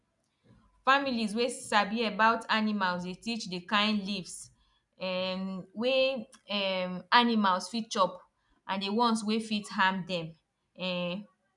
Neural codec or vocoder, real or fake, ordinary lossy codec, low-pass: none; real; none; 10.8 kHz